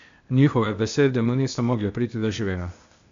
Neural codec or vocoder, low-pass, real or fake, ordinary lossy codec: codec, 16 kHz, 0.8 kbps, ZipCodec; 7.2 kHz; fake; MP3, 64 kbps